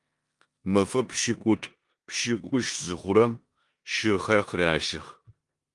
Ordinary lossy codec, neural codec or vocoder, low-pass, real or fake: Opus, 32 kbps; codec, 16 kHz in and 24 kHz out, 0.9 kbps, LongCat-Audio-Codec, four codebook decoder; 10.8 kHz; fake